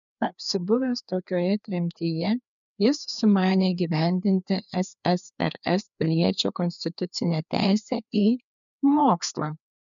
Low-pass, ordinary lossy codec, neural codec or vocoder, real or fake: 7.2 kHz; MP3, 96 kbps; codec, 16 kHz, 2 kbps, FreqCodec, larger model; fake